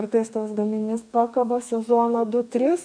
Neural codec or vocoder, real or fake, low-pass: codec, 32 kHz, 1.9 kbps, SNAC; fake; 9.9 kHz